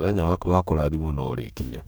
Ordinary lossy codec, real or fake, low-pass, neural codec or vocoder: none; fake; none; codec, 44.1 kHz, 2.6 kbps, DAC